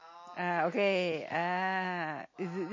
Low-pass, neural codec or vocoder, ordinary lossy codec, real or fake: 7.2 kHz; none; MP3, 32 kbps; real